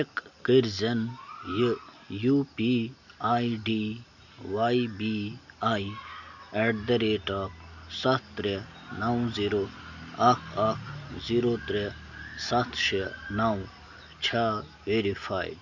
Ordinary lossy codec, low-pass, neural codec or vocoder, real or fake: none; 7.2 kHz; none; real